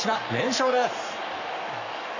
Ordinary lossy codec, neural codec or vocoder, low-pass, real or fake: none; codec, 44.1 kHz, 7.8 kbps, Pupu-Codec; 7.2 kHz; fake